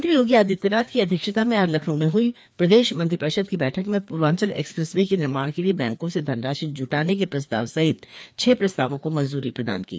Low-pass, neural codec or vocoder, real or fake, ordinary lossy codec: none; codec, 16 kHz, 2 kbps, FreqCodec, larger model; fake; none